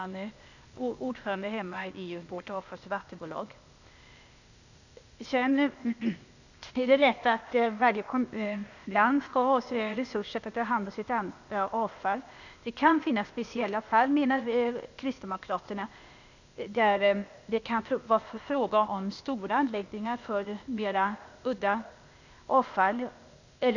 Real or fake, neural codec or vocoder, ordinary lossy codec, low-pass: fake; codec, 16 kHz, 0.8 kbps, ZipCodec; none; 7.2 kHz